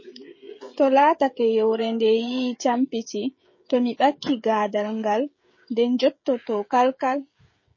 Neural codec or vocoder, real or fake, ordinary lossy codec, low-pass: codec, 16 kHz, 16 kbps, FreqCodec, smaller model; fake; MP3, 32 kbps; 7.2 kHz